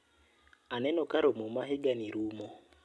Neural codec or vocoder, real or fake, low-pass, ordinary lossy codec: none; real; none; none